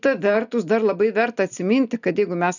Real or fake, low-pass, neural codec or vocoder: real; 7.2 kHz; none